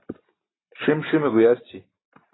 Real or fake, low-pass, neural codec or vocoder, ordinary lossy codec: real; 7.2 kHz; none; AAC, 16 kbps